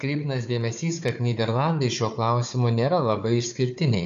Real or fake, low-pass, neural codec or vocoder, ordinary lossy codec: fake; 7.2 kHz; codec, 16 kHz, 4 kbps, FunCodec, trained on Chinese and English, 50 frames a second; AAC, 64 kbps